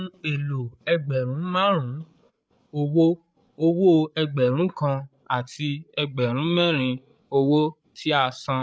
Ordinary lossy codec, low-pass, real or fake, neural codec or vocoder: none; none; fake; codec, 16 kHz, 8 kbps, FreqCodec, larger model